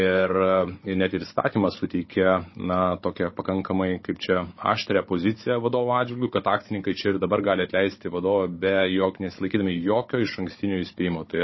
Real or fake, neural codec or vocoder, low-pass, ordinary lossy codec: fake; codec, 16 kHz, 16 kbps, FunCodec, trained on Chinese and English, 50 frames a second; 7.2 kHz; MP3, 24 kbps